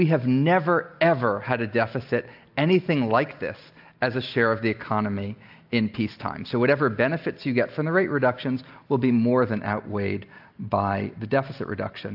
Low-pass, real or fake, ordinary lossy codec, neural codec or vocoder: 5.4 kHz; real; AAC, 48 kbps; none